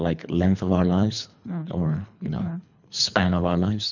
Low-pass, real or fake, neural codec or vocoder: 7.2 kHz; fake; codec, 24 kHz, 3 kbps, HILCodec